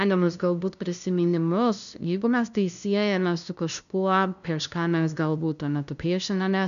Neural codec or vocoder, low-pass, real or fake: codec, 16 kHz, 0.5 kbps, FunCodec, trained on LibriTTS, 25 frames a second; 7.2 kHz; fake